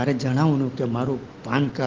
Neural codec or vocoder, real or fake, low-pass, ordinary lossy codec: none; real; 7.2 kHz; Opus, 16 kbps